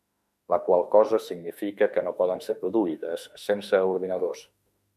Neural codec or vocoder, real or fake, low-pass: autoencoder, 48 kHz, 32 numbers a frame, DAC-VAE, trained on Japanese speech; fake; 14.4 kHz